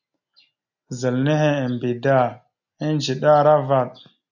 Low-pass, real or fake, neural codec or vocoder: 7.2 kHz; real; none